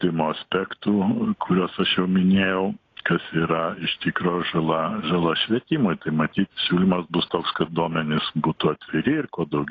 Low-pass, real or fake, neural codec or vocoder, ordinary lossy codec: 7.2 kHz; real; none; AAC, 32 kbps